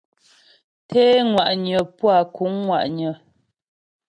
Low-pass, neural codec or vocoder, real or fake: 9.9 kHz; none; real